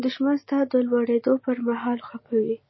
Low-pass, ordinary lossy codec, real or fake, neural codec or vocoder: 7.2 kHz; MP3, 24 kbps; real; none